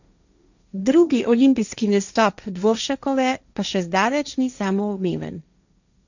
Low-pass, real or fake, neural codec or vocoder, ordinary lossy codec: 7.2 kHz; fake; codec, 16 kHz, 1.1 kbps, Voila-Tokenizer; none